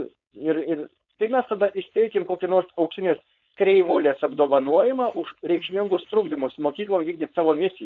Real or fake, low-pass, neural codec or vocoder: fake; 7.2 kHz; codec, 16 kHz, 4.8 kbps, FACodec